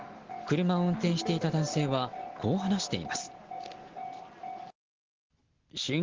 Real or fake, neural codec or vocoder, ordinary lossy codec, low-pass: real; none; Opus, 16 kbps; 7.2 kHz